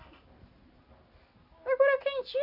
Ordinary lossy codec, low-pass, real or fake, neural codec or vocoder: none; 5.4 kHz; real; none